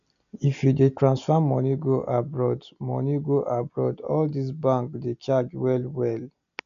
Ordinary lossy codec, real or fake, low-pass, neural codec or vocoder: none; real; 7.2 kHz; none